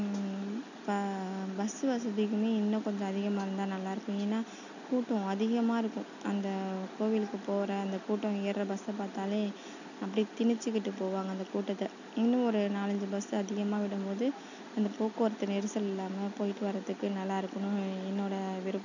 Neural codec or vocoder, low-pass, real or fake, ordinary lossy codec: none; 7.2 kHz; real; none